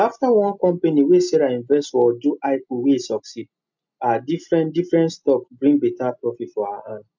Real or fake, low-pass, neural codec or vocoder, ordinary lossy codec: real; 7.2 kHz; none; MP3, 64 kbps